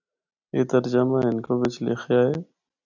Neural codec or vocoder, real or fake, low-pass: none; real; 7.2 kHz